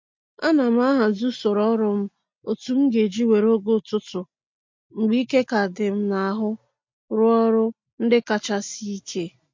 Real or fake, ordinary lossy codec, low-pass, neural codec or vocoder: real; MP3, 48 kbps; 7.2 kHz; none